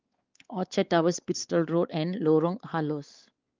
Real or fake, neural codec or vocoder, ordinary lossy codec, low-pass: fake; codec, 16 kHz, 4 kbps, X-Codec, WavLM features, trained on Multilingual LibriSpeech; Opus, 32 kbps; 7.2 kHz